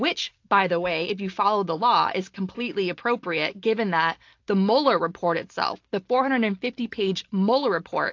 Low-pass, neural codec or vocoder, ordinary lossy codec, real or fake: 7.2 kHz; none; AAC, 48 kbps; real